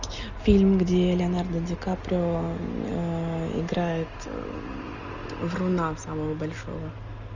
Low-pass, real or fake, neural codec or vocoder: 7.2 kHz; real; none